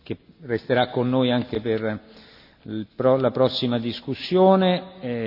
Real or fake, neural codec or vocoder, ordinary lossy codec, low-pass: real; none; none; 5.4 kHz